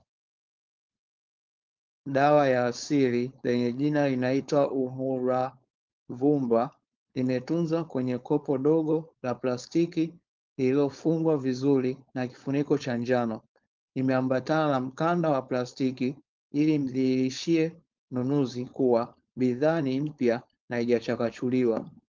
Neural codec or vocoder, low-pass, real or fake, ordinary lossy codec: codec, 16 kHz, 4.8 kbps, FACodec; 7.2 kHz; fake; Opus, 24 kbps